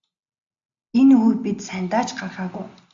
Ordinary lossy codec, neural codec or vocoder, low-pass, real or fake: AAC, 64 kbps; none; 7.2 kHz; real